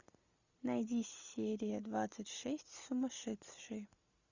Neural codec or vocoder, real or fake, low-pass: none; real; 7.2 kHz